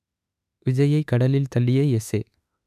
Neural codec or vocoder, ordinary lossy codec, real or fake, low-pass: autoencoder, 48 kHz, 32 numbers a frame, DAC-VAE, trained on Japanese speech; none; fake; 14.4 kHz